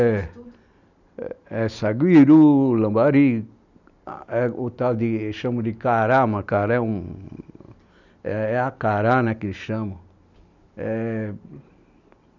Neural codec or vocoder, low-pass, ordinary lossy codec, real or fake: none; 7.2 kHz; none; real